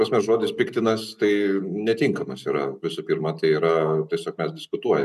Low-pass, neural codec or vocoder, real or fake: 14.4 kHz; none; real